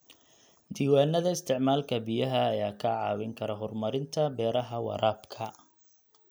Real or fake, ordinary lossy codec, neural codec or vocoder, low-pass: real; none; none; none